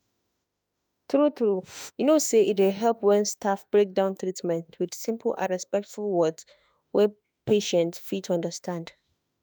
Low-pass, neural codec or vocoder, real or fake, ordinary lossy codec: none; autoencoder, 48 kHz, 32 numbers a frame, DAC-VAE, trained on Japanese speech; fake; none